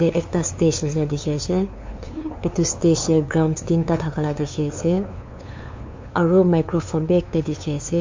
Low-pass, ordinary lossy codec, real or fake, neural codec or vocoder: 7.2 kHz; MP3, 48 kbps; fake; codec, 16 kHz, 2 kbps, FunCodec, trained on LibriTTS, 25 frames a second